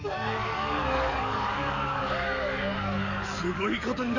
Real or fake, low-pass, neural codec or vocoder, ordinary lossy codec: fake; 7.2 kHz; codec, 44.1 kHz, 7.8 kbps, DAC; none